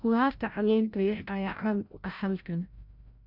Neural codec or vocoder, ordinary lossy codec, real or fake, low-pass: codec, 16 kHz, 0.5 kbps, FreqCodec, larger model; none; fake; 5.4 kHz